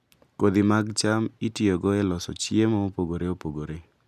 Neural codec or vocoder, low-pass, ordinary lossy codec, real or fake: none; 14.4 kHz; none; real